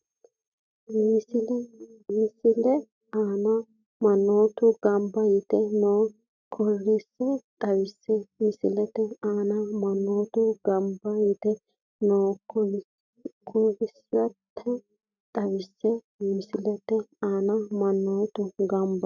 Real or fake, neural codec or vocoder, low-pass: real; none; 7.2 kHz